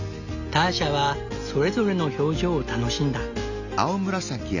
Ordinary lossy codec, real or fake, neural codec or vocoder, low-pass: none; real; none; 7.2 kHz